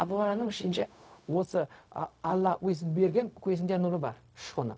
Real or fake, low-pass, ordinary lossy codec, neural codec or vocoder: fake; none; none; codec, 16 kHz, 0.4 kbps, LongCat-Audio-Codec